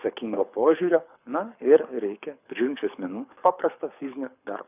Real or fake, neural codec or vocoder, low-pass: fake; codec, 24 kHz, 6 kbps, HILCodec; 3.6 kHz